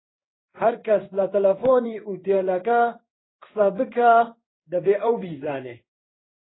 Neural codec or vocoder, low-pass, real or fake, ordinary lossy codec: codec, 16 kHz in and 24 kHz out, 1 kbps, XY-Tokenizer; 7.2 kHz; fake; AAC, 16 kbps